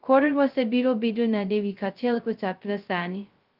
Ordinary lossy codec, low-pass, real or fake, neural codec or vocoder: Opus, 24 kbps; 5.4 kHz; fake; codec, 16 kHz, 0.2 kbps, FocalCodec